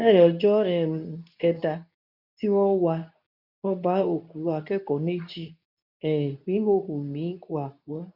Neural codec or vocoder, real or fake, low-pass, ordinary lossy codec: codec, 24 kHz, 0.9 kbps, WavTokenizer, medium speech release version 2; fake; 5.4 kHz; none